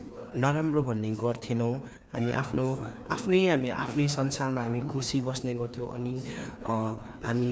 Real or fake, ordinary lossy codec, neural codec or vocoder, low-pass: fake; none; codec, 16 kHz, 2 kbps, FreqCodec, larger model; none